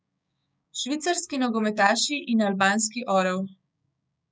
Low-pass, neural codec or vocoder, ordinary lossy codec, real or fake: none; codec, 16 kHz, 6 kbps, DAC; none; fake